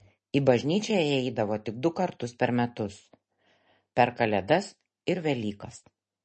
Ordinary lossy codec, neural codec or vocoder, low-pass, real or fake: MP3, 32 kbps; none; 10.8 kHz; real